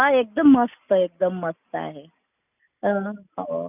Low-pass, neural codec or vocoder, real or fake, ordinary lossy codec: 3.6 kHz; none; real; none